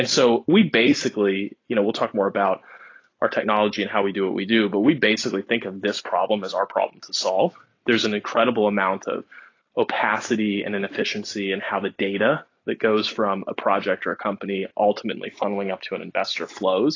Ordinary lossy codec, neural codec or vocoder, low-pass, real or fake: AAC, 32 kbps; none; 7.2 kHz; real